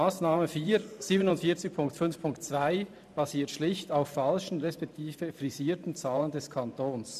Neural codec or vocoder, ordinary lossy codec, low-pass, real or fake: vocoder, 44.1 kHz, 128 mel bands every 512 samples, BigVGAN v2; none; 14.4 kHz; fake